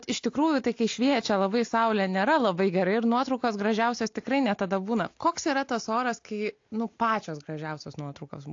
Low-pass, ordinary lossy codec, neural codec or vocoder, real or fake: 7.2 kHz; AAC, 48 kbps; none; real